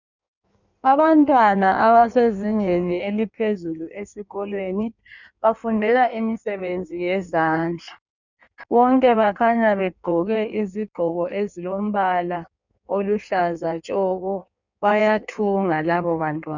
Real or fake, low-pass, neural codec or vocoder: fake; 7.2 kHz; codec, 16 kHz in and 24 kHz out, 1.1 kbps, FireRedTTS-2 codec